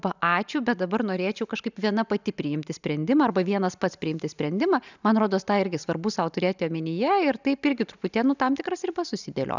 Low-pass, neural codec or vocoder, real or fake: 7.2 kHz; none; real